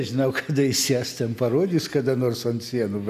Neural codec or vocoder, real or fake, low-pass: none; real; 14.4 kHz